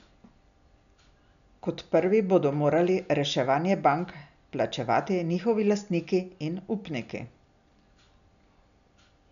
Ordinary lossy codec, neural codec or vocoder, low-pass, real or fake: none; none; 7.2 kHz; real